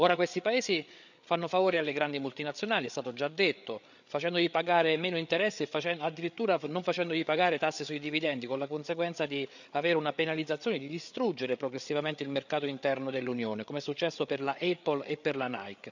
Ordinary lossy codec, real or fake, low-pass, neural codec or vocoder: none; fake; 7.2 kHz; codec, 16 kHz, 8 kbps, FreqCodec, larger model